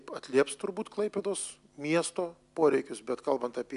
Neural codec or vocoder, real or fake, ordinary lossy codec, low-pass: none; real; MP3, 96 kbps; 10.8 kHz